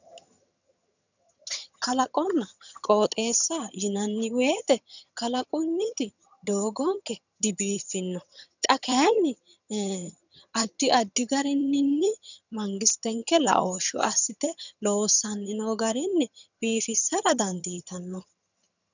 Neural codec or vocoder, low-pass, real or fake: vocoder, 22.05 kHz, 80 mel bands, HiFi-GAN; 7.2 kHz; fake